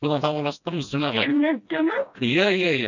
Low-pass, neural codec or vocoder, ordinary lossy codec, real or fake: 7.2 kHz; codec, 16 kHz, 1 kbps, FreqCodec, smaller model; none; fake